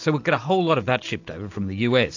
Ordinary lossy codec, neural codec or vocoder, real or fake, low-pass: AAC, 48 kbps; none; real; 7.2 kHz